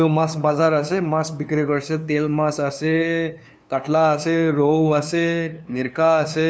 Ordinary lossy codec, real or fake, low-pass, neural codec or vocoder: none; fake; none; codec, 16 kHz, 2 kbps, FunCodec, trained on LibriTTS, 25 frames a second